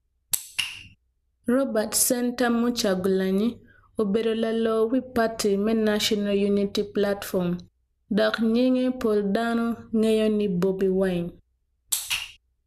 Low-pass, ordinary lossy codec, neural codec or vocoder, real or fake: 14.4 kHz; none; none; real